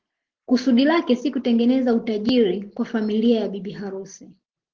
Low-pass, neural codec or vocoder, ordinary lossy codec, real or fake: 7.2 kHz; none; Opus, 16 kbps; real